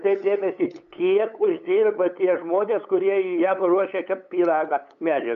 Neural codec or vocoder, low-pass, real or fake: codec, 16 kHz, 8 kbps, FunCodec, trained on LibriTTS, 25 frames a second; 7.2 kHz; fake